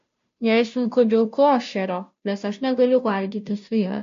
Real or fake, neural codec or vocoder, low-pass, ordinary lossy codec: fake; codec, 16 kHz, 0.5 kbps, FunCodec, trained on Chinese and English, 25 frames a second; 7.2 kHz; MP3, 48 kbps